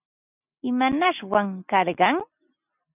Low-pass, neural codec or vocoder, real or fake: 3.6 kHz; none; real